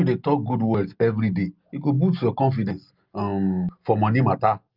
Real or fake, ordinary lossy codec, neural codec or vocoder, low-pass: real; Opus, 24 kbps; none; 5.4 kHz